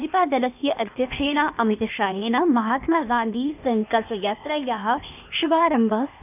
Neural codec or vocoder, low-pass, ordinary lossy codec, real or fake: codec, 16 kHz, 0.8 kbps, ZipCodec; 3.6 kHz; none; fake